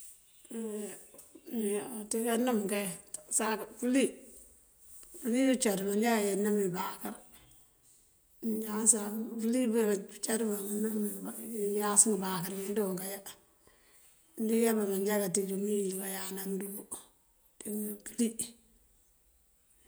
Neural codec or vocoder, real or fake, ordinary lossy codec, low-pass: vocoder, 48 kHz, 128 mel bands, Vocos; fake; none; none